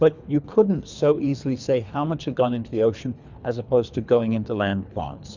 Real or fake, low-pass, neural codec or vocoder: fake; 7.2 kHz; codec, 24 kHz, 3 kbps, HILCodec